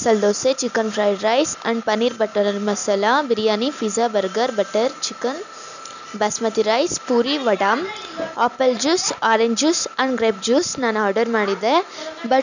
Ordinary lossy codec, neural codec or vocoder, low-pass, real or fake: none; none; 7.2 kHz; real